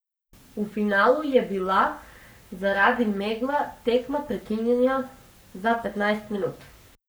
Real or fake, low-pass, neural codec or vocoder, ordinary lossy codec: fake; none; codec, 44.1 kHz, 7.8 kbps, Pupu-Codec; none